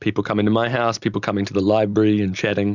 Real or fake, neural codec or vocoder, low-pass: real; none; 7.2 kHz